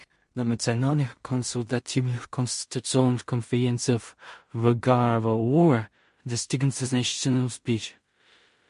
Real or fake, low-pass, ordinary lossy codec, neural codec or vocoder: fake; 10.8 kHz; MP3, 48 kbps; codec, 16 kHz in and 24 kHz out, 0.4 kbps, LongCat-Audio-Codec, two codebook decoder